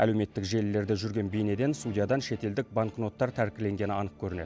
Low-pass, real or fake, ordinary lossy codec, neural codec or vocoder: none; real; none; none